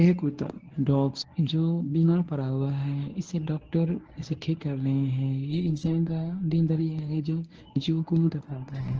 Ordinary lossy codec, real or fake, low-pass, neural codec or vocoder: Opus, 16 kbps; fake; 7.2 kHz; codec, 24 kHz, 0.9 kbps, WavTokenizer, medium speech release version 1